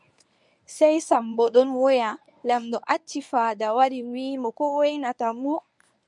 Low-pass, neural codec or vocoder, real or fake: 10.8 kHz; codec, 24 kHz, 0.9 kbps, WavTokenizer, medium speech release version 1; fake